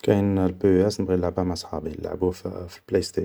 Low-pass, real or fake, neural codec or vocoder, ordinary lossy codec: none; real; none; none